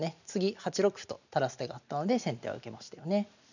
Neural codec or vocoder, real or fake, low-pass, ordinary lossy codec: none; real; 7.2 kHz; none